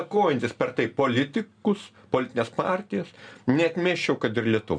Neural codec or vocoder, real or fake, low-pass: none; real; 9.9 kHz